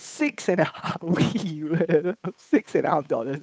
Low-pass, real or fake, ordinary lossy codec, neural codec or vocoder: none; fake; none; codec, 16 kHz, 8 kbps, FunCodec, trained on Chinese and English, 25 frames a second